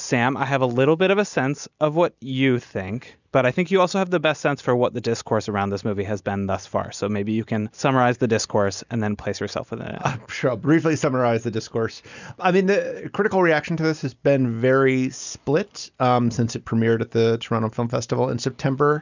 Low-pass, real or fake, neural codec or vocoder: 7.2 kHz; real; none